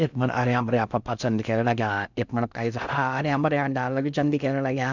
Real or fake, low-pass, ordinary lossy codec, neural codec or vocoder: fake; 7.2 kHz; none; codec, 16 kHz in and 24 kHz out, 0.6 kbps, FocalCodec, streaming, 4096 codes